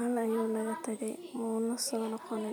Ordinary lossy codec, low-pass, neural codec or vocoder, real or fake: none; none; vocoder, 44.1 kHz, 128 mel bands every 512 samples, BigVGAN v2; fake